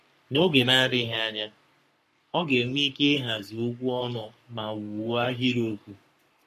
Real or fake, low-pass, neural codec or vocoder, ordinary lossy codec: fake; 14.4 kHz; codec, 44.1 kHz, 3.4 kbps, Pupu-Codec; MP3, 64 kbps